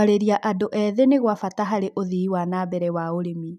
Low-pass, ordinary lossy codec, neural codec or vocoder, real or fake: 14.4 kHz; none; none; real